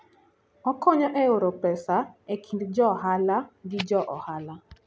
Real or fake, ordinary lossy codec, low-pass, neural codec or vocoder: real; none; none; none